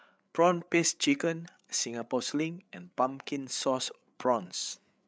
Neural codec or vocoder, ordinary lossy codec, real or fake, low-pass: codec, 16 kHz, 8 kbps, FreqCodec, larger model; none; fake; none